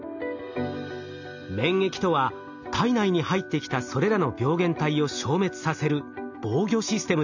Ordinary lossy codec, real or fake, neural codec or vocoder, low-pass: none; real; none; 7.2 kHz